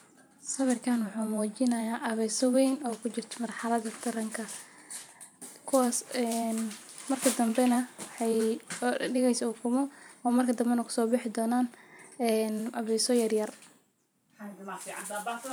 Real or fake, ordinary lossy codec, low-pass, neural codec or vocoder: fake; none; none; vocoder, 44.1 kHz, 128 mel bands every 512 samples, BigVGAN v2